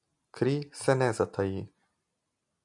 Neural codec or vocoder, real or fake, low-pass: none; real; 10.8 kHz